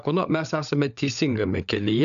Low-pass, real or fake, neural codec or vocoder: 7.2 kHz; fake; codec, 16 kHz, 16 kbps, FunCodec, trained on LibriTTS, 50 frames a second